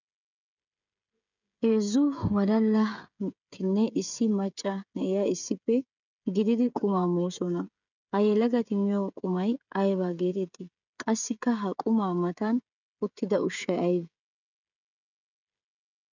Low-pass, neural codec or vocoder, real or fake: 7.2 kHz; codec, 16 kHz, 16 kbps, FreqCodec, smaller model; fake